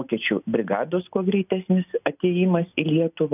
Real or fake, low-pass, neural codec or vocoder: real; 3.6 kHz; none